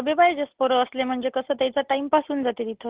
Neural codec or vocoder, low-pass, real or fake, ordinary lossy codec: none; 3.6 kHz; real; Opus, 16 kbps